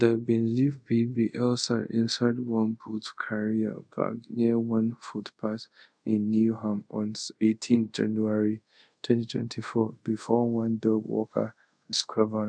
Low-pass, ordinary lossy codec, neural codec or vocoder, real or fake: 9.9 kHz; none; codec, 24 kHz, 0.5 kbps, DualCodec; fake